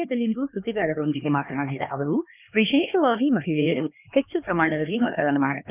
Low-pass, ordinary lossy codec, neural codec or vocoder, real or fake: 3.6 kHz; none; codec, 16 kHz, 2 kbps, X-Codec, HuBERT features, trained on LibriSpeech; fake